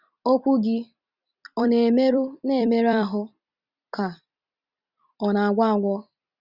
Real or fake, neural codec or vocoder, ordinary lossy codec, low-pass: fake; vocoder, 44.1 kHz, 128 mel bands every 256 samples, BigVGAN v2; Opus, 64 kbps; 5.4 kHz